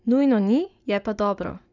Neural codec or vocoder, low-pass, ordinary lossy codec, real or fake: vocoder, 44.1 kHz, 80 mel bands, Vocos; 7.2 kHz; none; fake